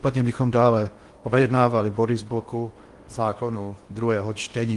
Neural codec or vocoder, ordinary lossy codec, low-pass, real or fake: codec, 16 kHz in and 24 kHz out, 0.8 kbps, FocalCodec, streaming, 65536 codes; Opus, 24 kbps; 10.8 kHz; fake